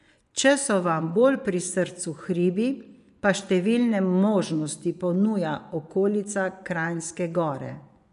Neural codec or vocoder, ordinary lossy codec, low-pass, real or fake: vocoder, 24 kHz, 100 mel bands, Vocos; none; 10.8 kHz; fake